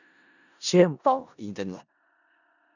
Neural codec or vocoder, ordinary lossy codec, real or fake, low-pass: codec, 16 kHz in and 24 kHz out, 0.4 kbps, LongCat-Audio-Codec, four codebook decoder; none; fake; 7.2 kHz